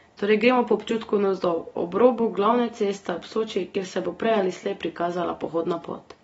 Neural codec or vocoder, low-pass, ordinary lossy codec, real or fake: none; 19.8 kHz; AAC, 24 kbps; real